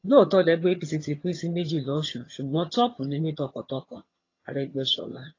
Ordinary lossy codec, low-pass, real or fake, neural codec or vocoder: AAC, 32 kbps; 7.2 kHz; fake; vocoder, 22.05 kHz, 80 mel bands, HiFi-GAN